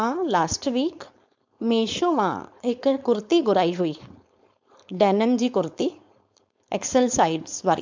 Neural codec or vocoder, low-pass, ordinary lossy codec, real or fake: codec, 16 kHz, 4.8 kbps, FACodec; 7.2 kHz; MP3, 64 kbps; fake